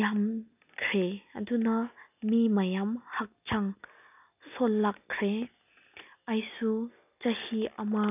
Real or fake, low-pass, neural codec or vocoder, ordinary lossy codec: real; 3.6 kHz; none; none